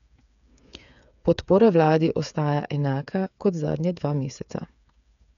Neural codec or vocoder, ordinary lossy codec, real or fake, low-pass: codec, 16 kHz, 8 kbps, FreqCodec, smaller model; none; fake; 7.2 kHz